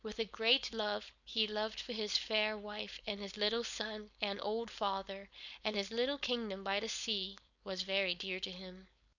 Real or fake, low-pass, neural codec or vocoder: fake; 7.2 kHz; codec, 16 kHz, 4.8 kbps, FACodec